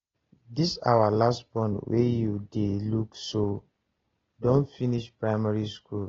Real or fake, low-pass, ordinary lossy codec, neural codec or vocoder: real; 7.2 kHz; AAC, 24 kbps; none